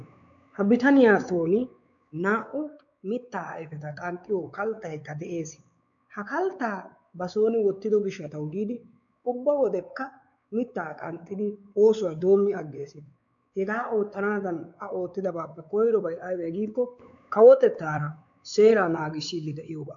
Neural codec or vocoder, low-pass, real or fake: codec, 16 kHz, 4 kbps, X-Codec, WavLM features, trained on Multilingual LibriSpeech; 7.2 kHz; fake